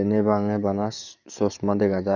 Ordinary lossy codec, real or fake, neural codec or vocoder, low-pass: none; fake; codec, 44.1 kHz, 7.8 kbps, DAC; 7.2 kHz